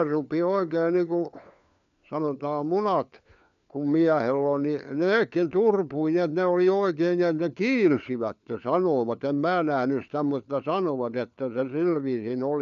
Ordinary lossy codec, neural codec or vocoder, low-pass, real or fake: none; codec, 16 kHz, 4 kbps, FunCodec, trained on LibriTTS, 50 frames a second; 7.2 kHz; fake